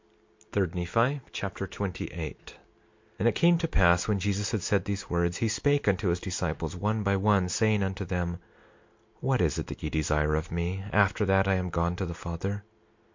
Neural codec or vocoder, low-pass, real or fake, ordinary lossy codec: none; 7.2 kHz; real; MP3, 48 kbps